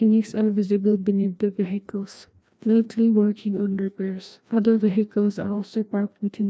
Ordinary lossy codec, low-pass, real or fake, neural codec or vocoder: none; none; fake; codec, 16 kHz, 1 kbps, FreqCodec, larger model